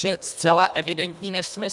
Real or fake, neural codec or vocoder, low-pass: fake; codec, 24 kHz, 1.5 kbps, HILCodec; 10.8 kHz